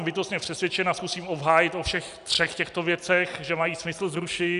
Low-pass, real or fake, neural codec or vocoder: 10.8 kHz; real; none